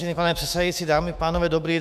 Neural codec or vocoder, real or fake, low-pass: autoencoder, 48 kHz, 128 numbers a frame, DAC-VAE, trained on Japanese speech; fake; 14.4 kHz